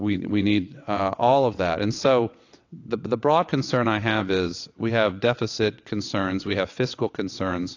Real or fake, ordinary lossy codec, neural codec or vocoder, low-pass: fake; AAC, 48 kbps; vocoder, 22.05 kHz, 80 mel bands, WaveNeXt; 7.2 kHz